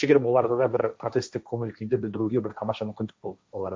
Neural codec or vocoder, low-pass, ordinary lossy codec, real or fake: codec, 16 kHz, 1.1 kbps, Voila-Tokenizer; none; none; fake